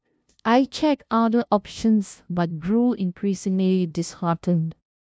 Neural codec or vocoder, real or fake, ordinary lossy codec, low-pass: codec, 16 kHz, 0.5 kbps, FunCodec, trained on LibriTTS, 25 frames a second; fake; none; none